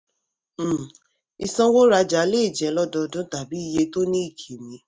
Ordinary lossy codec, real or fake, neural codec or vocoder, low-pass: none; real; none; none